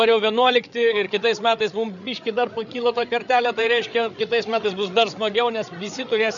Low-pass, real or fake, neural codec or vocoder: 7.2 kHz; fake; codec, 16 kHz, 8 kbps, FreqCodec, larger model